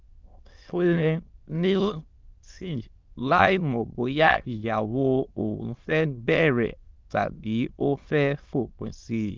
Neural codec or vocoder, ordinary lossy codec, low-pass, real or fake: autoencoder, 22.05 kHz, a latent of 192 numbers a frame, VITS, trained on many speakers; Opus, 24 kbps; 7.2 kHz; fake